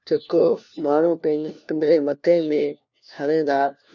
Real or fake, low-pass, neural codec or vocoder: fake; 7.2 kHz; codec, 16 kHz, 1 kbps, FunCodec, trained on LibriTTS, 50 frames a second